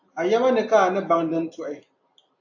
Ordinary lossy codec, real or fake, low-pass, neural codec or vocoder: AAC, 48 kbps; real; 7.2 kHz; none